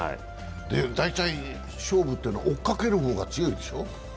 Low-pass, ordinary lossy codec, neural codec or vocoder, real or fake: none; none; none; real